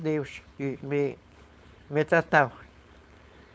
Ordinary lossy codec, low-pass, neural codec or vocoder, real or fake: none; none; codec, 16 kHz, 4.8 kbps, FACodec; fake